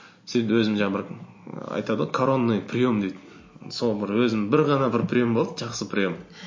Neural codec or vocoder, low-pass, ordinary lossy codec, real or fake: none; 7.2 kHz; MP3, 32 kbps; real